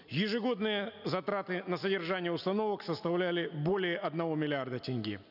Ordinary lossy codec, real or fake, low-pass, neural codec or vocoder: none; real; 5.4 kHz; none